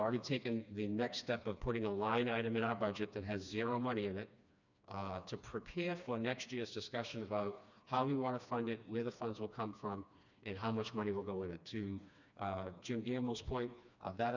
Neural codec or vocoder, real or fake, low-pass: codec, 16 kHz, 2 kbps, FreqCodec, smaller model; fake; 7.2 kHz